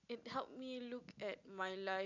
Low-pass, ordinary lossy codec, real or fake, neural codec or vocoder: 7.2 kHz; none; real; none